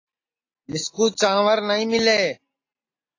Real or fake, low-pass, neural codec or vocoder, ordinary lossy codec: real; 7.2 kHz; none; AAC, 32 kbps